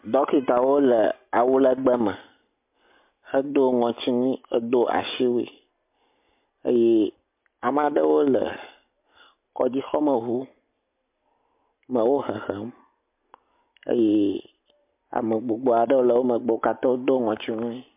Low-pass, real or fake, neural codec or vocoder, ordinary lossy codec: 3.6 kHz; real; none; MP3, 32 kbps